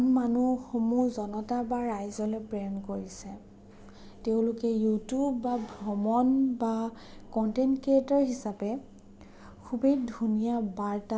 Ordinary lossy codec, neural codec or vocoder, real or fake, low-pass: none; none; real; none